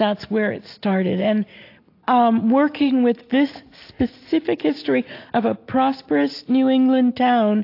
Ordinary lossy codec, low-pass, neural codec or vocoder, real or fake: AAC, 32 kbps; 5.4 kHz; none; real